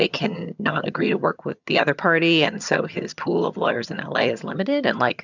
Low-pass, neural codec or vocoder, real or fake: 7.2 kHz; vocoder, 22.05 kHz, 80 mel bands, HiFi-GAN; fake